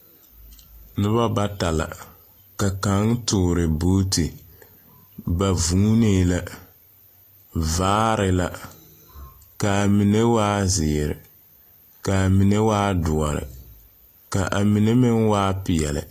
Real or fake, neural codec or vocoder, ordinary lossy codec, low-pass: real; none; AAC, 48 kbps; 14.4 kHz